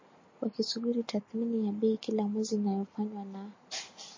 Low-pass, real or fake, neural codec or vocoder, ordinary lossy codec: 7.2 kHz; real; none; MP3, 32 kbps